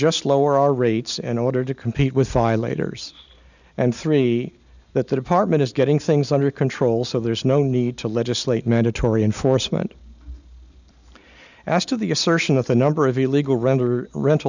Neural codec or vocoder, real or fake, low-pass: none; real; 7.2 kHz